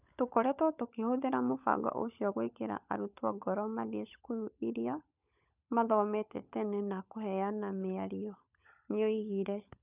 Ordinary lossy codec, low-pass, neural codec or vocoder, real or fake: none; 3.6 kHz; codec, 16 kHz, 16 kbps, FunCodec, trained on LibriTTS, 50 frames a second; fake